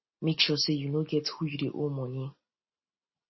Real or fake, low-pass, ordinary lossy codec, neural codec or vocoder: real; 7.2 kHz; MP3, 24 kbps; none